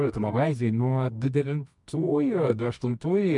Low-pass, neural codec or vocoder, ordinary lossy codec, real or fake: 10.8 kHz; codec, 24 kHz, 0.9 kbps, WavTokenizer, medium music audio release; MP3, 64 kbps; fake